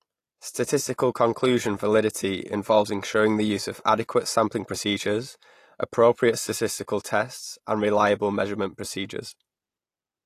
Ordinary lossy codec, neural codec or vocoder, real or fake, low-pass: AAC, 48 kbps; none; real; 14.4 kHz